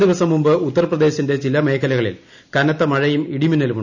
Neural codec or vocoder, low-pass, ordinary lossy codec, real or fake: none; 7.2 kHz; none; real